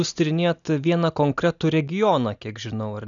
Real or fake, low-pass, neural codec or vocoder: real; 7.2 kHz; none